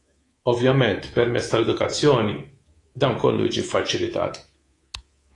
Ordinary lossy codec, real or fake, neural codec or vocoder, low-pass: AAC, 32 kbps; fake; codec, 24 kHz, 3.1 kbps, DualCodec; 10.8 kHz